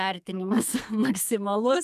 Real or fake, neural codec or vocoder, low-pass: fake; codec, 32 kHz, 1.9 kbps, SNAC; 14.4 kHz